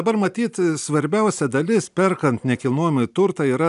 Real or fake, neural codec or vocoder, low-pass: real; none; 10.8 kHz